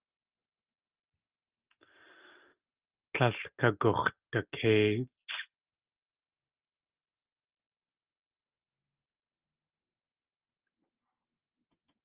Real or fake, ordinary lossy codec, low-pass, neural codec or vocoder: real; Opus, 24 kbps; 3.6 kHz; none